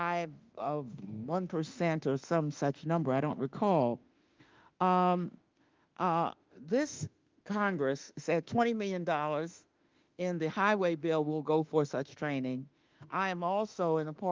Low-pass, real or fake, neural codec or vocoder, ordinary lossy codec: 7.2 kHz; fake; autoencoder, 48 kHz, 32 numbers a frame, DAC-VAE, trained on Japanese speech; Opus, 24 kbps